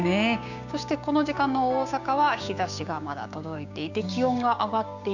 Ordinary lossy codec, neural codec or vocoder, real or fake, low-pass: none; codec, 16 kHz, 6 kbps, DAC; fake; 7.2 kHz